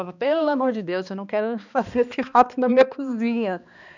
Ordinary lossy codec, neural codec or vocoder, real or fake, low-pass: none; codec, 16 kHz, 2 kbps, X-Codec, HuBERT features, trained on balanced general audio; fake; 7.2 kHz